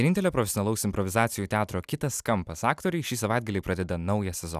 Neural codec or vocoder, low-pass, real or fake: none; 14.4 kHz; real